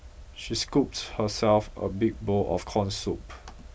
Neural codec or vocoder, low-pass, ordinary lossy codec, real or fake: none; none; none; real